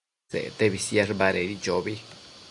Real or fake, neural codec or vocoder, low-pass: real; none; 10.8 kHz